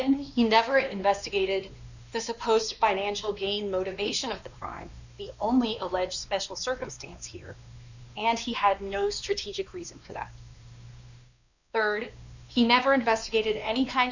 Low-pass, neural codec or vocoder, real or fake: 7.2 kHz; codec, 16 kHz, 2 kbps, X-Codec, WavLM features, trained on Multilingual LibriSpeech; fake